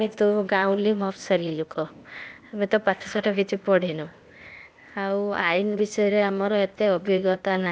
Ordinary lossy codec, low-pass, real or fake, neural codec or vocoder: none; none; fake; codec, 16 kHz, 0.8 kbps, ZipCodec